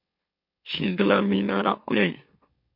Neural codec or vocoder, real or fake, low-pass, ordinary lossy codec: autoencoder, 44.1 kHz, a latent of 192 numbers a frame, MeloTTS; fake; 5.4 kHz; MP3, 32 kbps